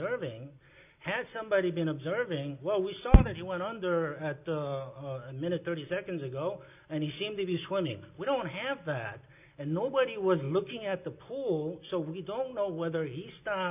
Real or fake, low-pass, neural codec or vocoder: real; 3.6 kHz; none